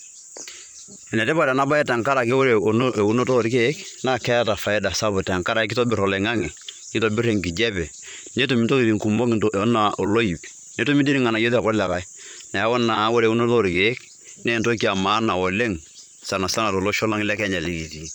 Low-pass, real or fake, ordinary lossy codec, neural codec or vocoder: 19.8 kHz; fake; none; vocoder, 44.1 kHz, 128 mel bands, Pupu-Vocoder